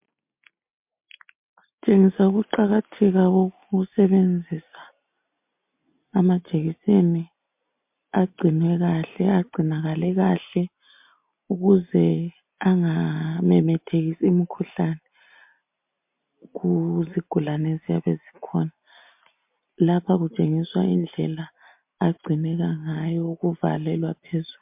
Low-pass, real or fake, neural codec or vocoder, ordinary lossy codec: 3.6 kHz; real; none; MP3, 32 kbps